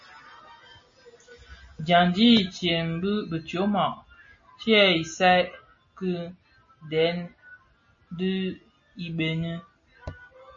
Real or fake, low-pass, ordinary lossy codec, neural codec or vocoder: real; 7.2 kHz; MP3, 32 kbps; none